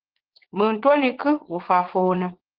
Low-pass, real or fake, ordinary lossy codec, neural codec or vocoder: 5.4 kHz; fake; Opus, 16 kbps; vocoder, 44.1 kHz, 80 mel bands, Vocos